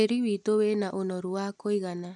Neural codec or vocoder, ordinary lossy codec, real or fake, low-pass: none; none; real; 10.8 kHz